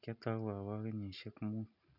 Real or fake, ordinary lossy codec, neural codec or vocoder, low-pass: real; none; none; 5.4 kHz